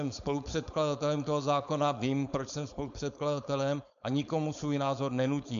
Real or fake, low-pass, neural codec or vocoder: fake; 7.2 kHz; codec, 16 kHz, 4.8 kbps, FACodec